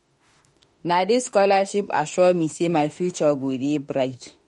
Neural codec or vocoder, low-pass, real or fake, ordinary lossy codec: autoencoder, 48 kHz, 32 numbers a frame, DAC-VAE, trained on Japanese speech; 19.8 kHz; fake; MP3, 48 kbps